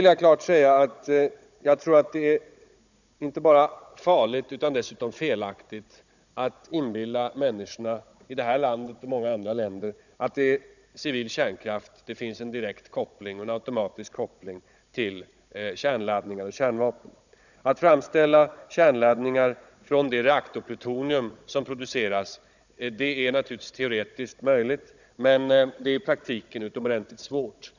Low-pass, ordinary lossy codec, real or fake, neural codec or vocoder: 7.2 kHz; none; fake; codec, 16 kHz, 16 kbps, FunCodec, trained on Chinese and English, 50 frames a second